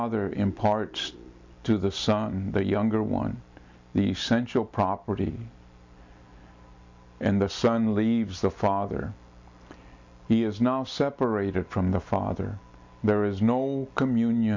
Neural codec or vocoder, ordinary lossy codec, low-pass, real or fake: none; MP3, 64 kbps; 7.2 kHz; real